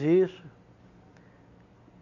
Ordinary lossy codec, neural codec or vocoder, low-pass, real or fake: none; none; 7.2 kHz; real